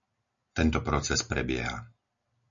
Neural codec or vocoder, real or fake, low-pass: none; real; 7.2 kHz